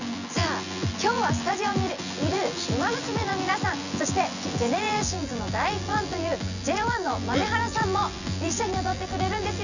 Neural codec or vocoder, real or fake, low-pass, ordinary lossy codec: vocoder, 24 kHz, 100 mel bands, Vocos; fake; 7.2 kHz; none